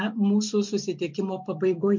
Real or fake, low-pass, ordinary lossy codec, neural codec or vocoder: real; 7.2 kHz; MP3, 48 kbps; none